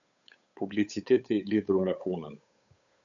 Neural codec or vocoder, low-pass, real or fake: codec, 16 kHz, 8 kbps, FunCodec, trained on Chinese and English, 25 frames a second; 7.2 kHz; fake